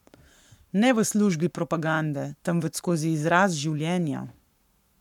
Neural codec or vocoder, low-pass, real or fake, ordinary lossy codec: codec, 44.1 kHz, 7.8 kbps, Pupu-Codec; 19.8 kHz; fake; none